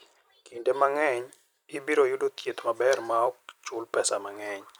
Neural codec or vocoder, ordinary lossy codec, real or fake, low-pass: vocoder, 44.1 kHz, 128 mel bands every 256 samples, BigVGAN v2; none; fake; none